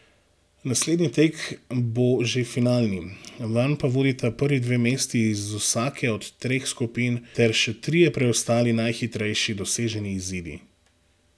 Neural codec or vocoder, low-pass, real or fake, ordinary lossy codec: none; none; real; none